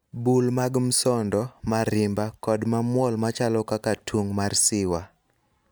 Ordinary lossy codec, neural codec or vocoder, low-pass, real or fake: none; none; none; real